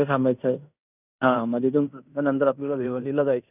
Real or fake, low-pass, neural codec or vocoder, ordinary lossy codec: fake; 3.6 kHz; codec, 16 kHz in and 24 kHz out, 0.9 kbps, LongCat-Audio-Codec, fine tuned four codebook decoder; none